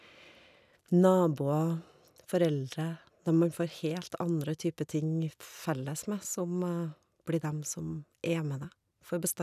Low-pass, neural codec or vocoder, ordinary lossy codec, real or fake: 14.4 kHz; none; none; real